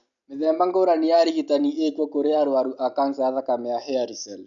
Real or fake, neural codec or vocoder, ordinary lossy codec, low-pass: real; none; none; 7.2 kHz